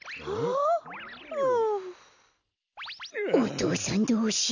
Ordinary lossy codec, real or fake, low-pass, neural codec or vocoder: none; real; 7.2 kHz; none